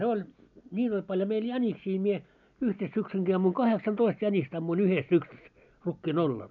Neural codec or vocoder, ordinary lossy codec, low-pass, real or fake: none; none; 7.2 kHz; real